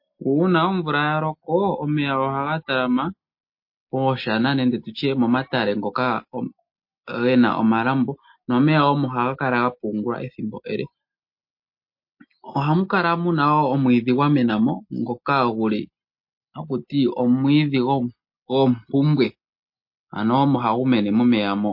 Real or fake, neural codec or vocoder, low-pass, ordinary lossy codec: real; none; 5.4 kHz; MP3, 32 kbps